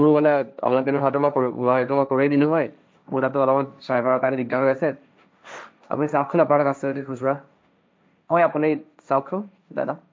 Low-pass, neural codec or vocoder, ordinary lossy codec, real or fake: none; codec, 16 kHz, 1.1 kbps, Voila-Tokenizer; none; fake